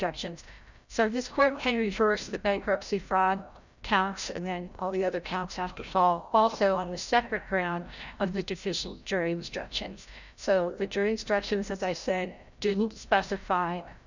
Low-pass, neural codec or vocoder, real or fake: 7.2 kHz; codec, 16 kHz, 0.5 kbps, FreqCodec, larger model; fake